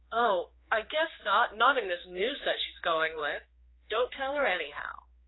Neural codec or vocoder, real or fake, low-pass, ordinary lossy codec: codec, 16 kHz, 4 kbps, X-Codec, HuBERT features, trained on general audio; fake; 7.2 kHz; AAC, 16 kbps